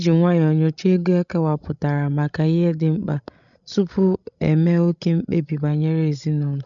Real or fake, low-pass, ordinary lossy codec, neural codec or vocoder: fake; 7.2 kHz; none; codec, 16 kHz, 16 kbps, FreqCodec, larger model